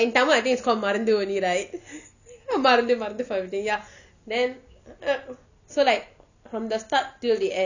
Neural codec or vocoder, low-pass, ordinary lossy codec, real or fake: none; 7.2 kHz; none; real